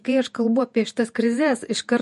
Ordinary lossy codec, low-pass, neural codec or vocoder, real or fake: MP3, 48 kbps; 14.4 kHz; vocoder, 48 kHz, 128 mel bands, Vocos; fake